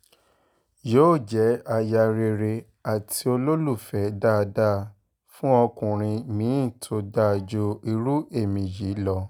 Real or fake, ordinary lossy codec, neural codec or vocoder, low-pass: real; none; none; none